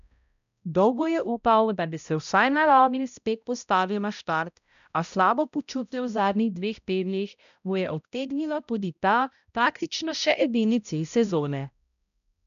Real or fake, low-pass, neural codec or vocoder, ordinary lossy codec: fake; 7.2 kHz; codec, 16 kHz, 0.5 kbps, X-Codec, HuBERT features, trained on balanced general audio; none